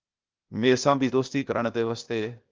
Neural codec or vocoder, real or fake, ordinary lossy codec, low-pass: codec, 16 kHz, 0.8 kbps, ZipCodec; fake; Opus, 24 kbps; 7.2 kHz